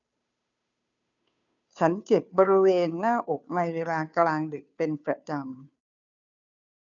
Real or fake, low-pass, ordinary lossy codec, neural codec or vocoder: fake; 7.2 kHz; none; codec, 16 kHz, 2 kbps, FunCodec, trained on Chinese and English, 25 frames a second